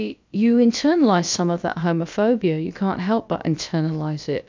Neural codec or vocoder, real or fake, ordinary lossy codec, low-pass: codec, 16 kHz, about 1 kbps, DyCAST, with the encoder's durations; fake; MP3, 64 kbps; 7.2 kHz